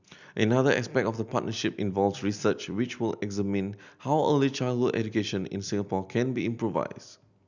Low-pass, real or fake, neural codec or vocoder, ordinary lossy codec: 7.2 kHz; real; none; none